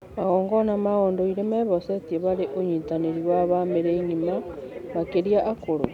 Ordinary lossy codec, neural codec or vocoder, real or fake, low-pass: none; none; real; 19.8 kHz